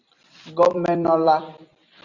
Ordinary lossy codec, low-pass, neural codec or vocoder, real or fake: Opus, 64 kbps; 7.2 kHz; vocoder, 44.1 kHz, 128 mel bands every 256 samples, BigVGAN v2; fake